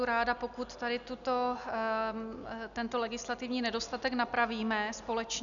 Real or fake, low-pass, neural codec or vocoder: real; 7.2 kHz; none